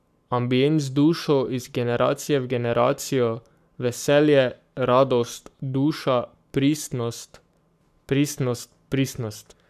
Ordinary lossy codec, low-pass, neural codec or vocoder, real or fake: none; 14.4 kHz; codec, 44.1 kHz, 7.8 kbps, Pupu-Codec; fake